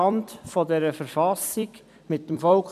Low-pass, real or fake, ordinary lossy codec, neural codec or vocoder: 14.4 kHz; fake; none; vocoder, 44.1 kHz, 128 mel bands every 256 samples, BigVGAN v2